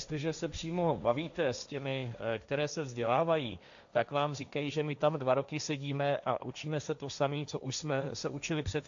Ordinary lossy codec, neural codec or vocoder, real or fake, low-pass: AAC, 64 kbps; codec, 16 kHz, 1.1 kbps, Voila-Tokenizer; fake; 7.2 kHz